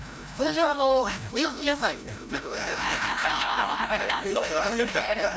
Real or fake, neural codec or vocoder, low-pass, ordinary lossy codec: fake; codec, 16 kHz, 0.5 kbps, FreqCodec, larger model; none; none